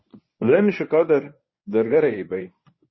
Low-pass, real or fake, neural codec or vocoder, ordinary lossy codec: 7.2 kHz; fake; codec, 24 kHz, 0.9 kbps, WavTokenizer, medium speech release version 1; MP3, 24 kbps